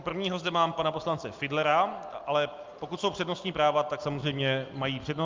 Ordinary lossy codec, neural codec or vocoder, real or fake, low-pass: Opus, 24 kbps; none; real; 7.2 kHz